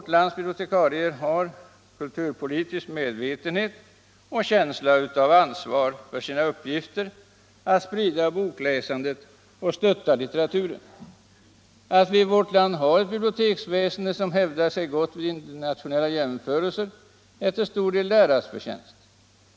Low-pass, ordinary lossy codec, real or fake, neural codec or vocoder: none; none; real; none